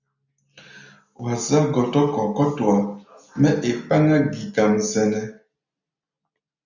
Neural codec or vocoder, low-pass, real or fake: none; 7.2 kHz; real